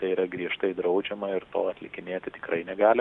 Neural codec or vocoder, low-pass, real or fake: none; 10.8 kHz; real